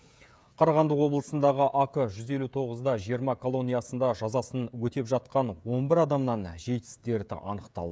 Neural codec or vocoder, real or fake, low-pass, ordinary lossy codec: codec, 16 kHz, 16 kbps, FreqCodec, smaller model; fake; none; none